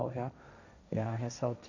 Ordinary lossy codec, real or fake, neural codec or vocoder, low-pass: none; fake; codec, 16 kHz, 1.1 kbps, Voila-Tokenizer; 7.2 kHz